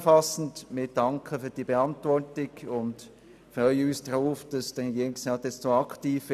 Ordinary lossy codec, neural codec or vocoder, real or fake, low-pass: none; none; real; 14.4 kHz